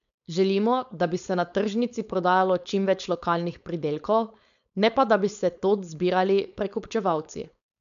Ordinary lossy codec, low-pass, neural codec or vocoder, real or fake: none; 7.2 kHz; codec, 16 kHz, 4.8 kbps, FACodec; fake